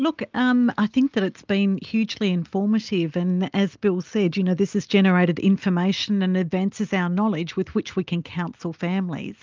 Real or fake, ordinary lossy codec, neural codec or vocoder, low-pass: real; Opus, 24 kbps; none; 7.2 kHz